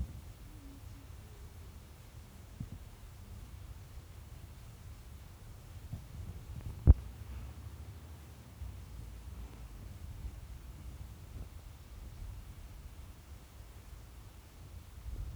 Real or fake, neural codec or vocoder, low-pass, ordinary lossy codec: fake; codec, 44.1 kHz, 7.8 kbps, Pupu-Codec; none; none